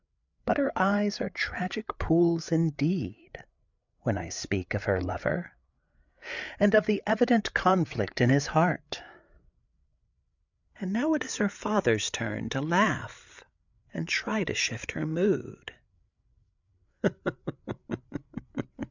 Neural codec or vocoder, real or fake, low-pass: codec, 16 kHz, 8 kbps, FreqCodec, larger model; fake; 7.2 kHz